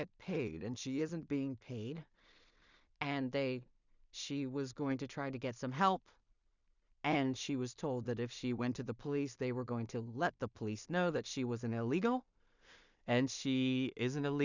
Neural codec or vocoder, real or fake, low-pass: codec, 16 kHz in and 24 kHz out, 0.4 kbps, LongCat-Audio-Codec, two codebook decoder; fake; 7.2 kHz